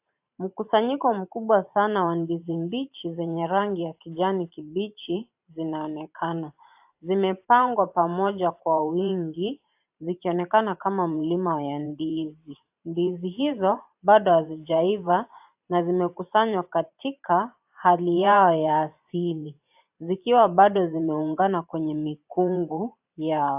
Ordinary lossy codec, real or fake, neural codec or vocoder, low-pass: AAC, 32 kbps; fake; vocoder, 44.1 kHz, 128 mel bands every 512 samples, BigVGAN v2; 3.6 kHz